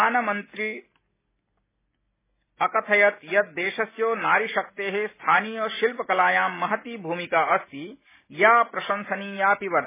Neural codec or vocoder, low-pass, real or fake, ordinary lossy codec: none; 3.6 kHz; real; MP3, 16 kbps